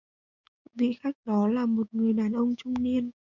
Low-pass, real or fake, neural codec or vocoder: 7.2 kHz; fake; codec, 16 kHz, 6 kbps, DAC